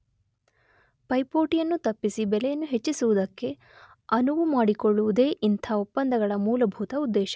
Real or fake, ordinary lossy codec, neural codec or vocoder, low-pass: real; none; none; none